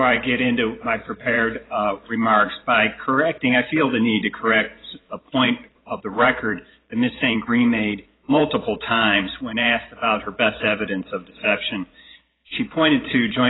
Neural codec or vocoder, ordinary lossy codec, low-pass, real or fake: vocoder, 44.1 kHz, 128 mel bands every 512 samples, BigVGAN v2; AAC, 16 kbps; 7.2 kHz; fake